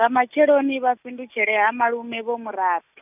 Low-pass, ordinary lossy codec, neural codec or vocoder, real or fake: 3.6 kHz; none; none; real